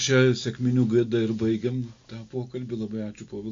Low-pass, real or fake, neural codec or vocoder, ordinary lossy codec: 7.2 kHz; real; none; AAC, 48 kbps